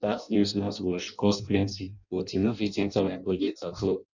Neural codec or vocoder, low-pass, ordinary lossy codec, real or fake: codec, 16 kHz in and 24 kHz out, 0.6 kbps, FireRedTTS-2 codec; 7.2 kHz; none; fake